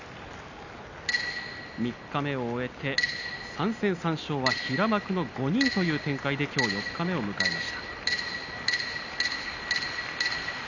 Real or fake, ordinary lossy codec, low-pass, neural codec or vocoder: real; none; 7.2 kHz; none